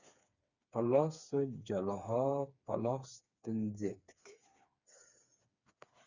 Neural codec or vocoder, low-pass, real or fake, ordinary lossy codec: codec, 16 kHz, 4 kbps, FreqCodec, smaller model; 7.2 kHz; fake; Opus, 64 kbps